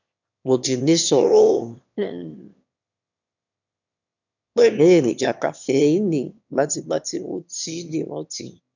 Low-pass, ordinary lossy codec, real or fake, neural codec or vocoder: 7.2 kHz; none; fake; autoencoder, 22.05 kHz, a latent of 192 numbers a frame, VITS, trained on one speaker